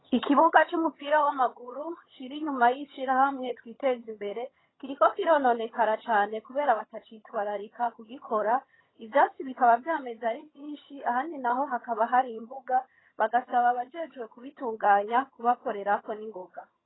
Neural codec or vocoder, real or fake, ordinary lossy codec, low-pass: vocoder, 22.05 kHz, 80 mel bands, HiFi-GAN; fake; AAC, 16 kbps; 7.2 kHz